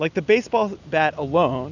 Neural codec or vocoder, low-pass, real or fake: none; 7.2 kHz; real